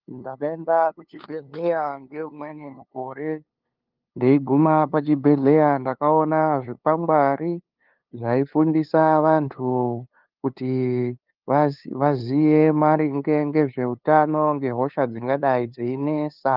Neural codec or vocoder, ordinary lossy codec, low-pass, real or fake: codec, 16 kHz, 2 kbps, FunCodec, trained on LibriTTS, 25 frames a second; Opus, 32 kbps; 5.4 kHz; fake